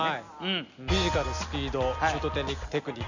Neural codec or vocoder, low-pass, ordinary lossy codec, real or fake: none; 7.2 kHz; none; real